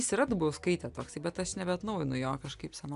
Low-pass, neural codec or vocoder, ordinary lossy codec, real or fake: 10.8 kHz; none; AAC, 96 kbps; real